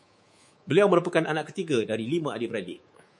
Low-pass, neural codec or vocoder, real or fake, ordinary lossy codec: 10.8 kHz; codec, 24 kHz, 3.1 kbps, DualCodec; fake; MP3, 48 kbps